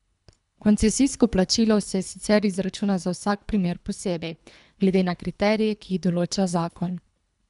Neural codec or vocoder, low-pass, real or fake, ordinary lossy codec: codec, 24 kHz, 3 kbps, HILCodec; 10.8 kHz; fake; none